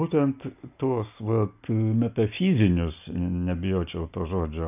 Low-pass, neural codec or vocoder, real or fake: 3.6 kHz; none; real